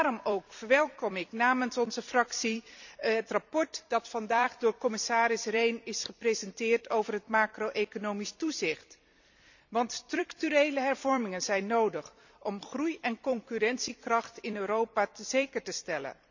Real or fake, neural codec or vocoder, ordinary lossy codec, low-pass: fake; vocoder, 44.1 kHz, 128 mel bands every 256 samples, BigVGAN v2; none; 7.2 kHz